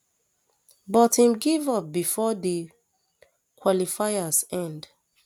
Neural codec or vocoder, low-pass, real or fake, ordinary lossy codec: none; none; real; none